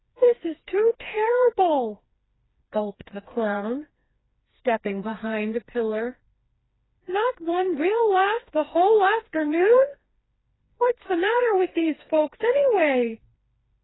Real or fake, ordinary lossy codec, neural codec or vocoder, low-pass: fake; AAC, 16 kbps; codec, 16 kHz, 2 kbps, FreqCodec, smaller model; 7.2 kHz